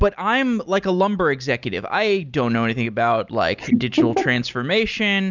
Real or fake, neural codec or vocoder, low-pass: real; none; 7.2 kHz